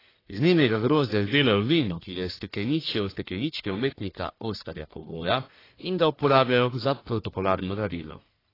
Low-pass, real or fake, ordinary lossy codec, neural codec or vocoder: 5.4 kHz; fake; AAC, 24 kbps; codec, 44.1 kHz, 1.7 kbps, Pupu-Codec